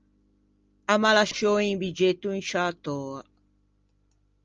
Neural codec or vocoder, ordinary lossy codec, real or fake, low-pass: none; Opus, 24 kbps; real; 7.2 kHz